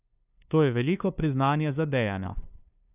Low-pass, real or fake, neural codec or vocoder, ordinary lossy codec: 3.6 kHz; fake; codec, 16 kHz, 2 kbps, FunCodec, trained on LibriTTS, 25 frames a second; none